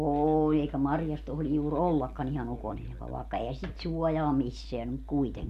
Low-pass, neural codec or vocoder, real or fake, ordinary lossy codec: 14.4 kHz; none; real; none